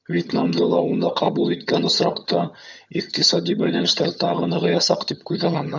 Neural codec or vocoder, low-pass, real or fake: vocoder, 22.05 kHz, 80 mel bands, HiFi-GAN; 7.2 kHz; fake